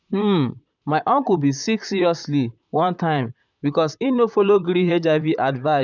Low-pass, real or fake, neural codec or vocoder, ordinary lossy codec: 7.2 kHz; fake; vocoder, 44.1 kHz, 128 mel bands, Pupu-Vocoder; none